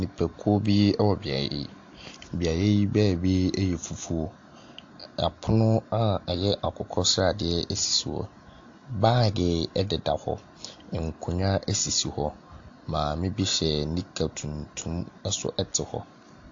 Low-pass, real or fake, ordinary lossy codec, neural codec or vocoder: 7.2 kHz; real; AAC, 48 kbps; none